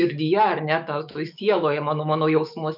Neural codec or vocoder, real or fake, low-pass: autoencoder, 48 kHz, 128 numbers a frame, DAC-VAE, trained on Japanese speech; fake; 5.4 kHz